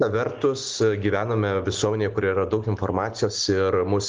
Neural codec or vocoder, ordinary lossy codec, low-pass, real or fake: none; Opus, 16 kbps; 7.2 kHz; real